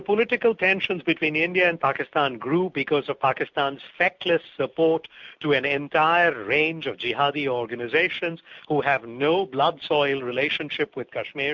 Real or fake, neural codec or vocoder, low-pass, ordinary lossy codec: real; none; 7.2 kHz; MP3, 64 kbps